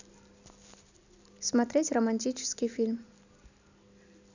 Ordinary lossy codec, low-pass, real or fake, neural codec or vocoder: none; 7.2 kHz; real; none